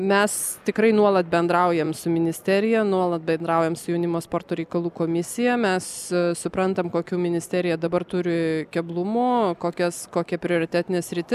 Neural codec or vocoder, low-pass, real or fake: none; 14.4 kHz; real